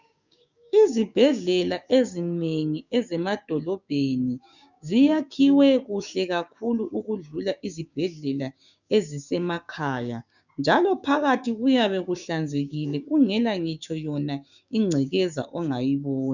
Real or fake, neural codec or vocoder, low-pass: fake; codec, 16 kHz, 6 kbps, DAC; 7.2 kHz